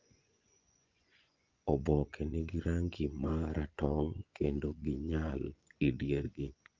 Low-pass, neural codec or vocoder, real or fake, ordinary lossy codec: 7.2 kHz; vocoder, 24 kHz, 100 mel bands, Vocos; fake; Opus, 24 kbps